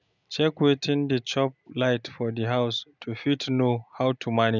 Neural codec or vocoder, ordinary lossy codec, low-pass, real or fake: none; none; 7.2 kHz; real